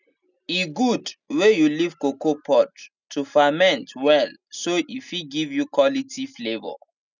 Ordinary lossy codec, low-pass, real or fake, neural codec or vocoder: none; 7.2 kHz; real; none